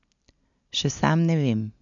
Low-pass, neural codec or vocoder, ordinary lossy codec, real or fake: 7.2 kHz; none; none; real